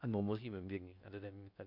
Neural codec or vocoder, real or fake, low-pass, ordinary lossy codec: codec, 16 kHz in and 24 kHz out, 0.6 kbps, FocalCodec, streaming, 4096 codes; fake; 5.4 kHz; none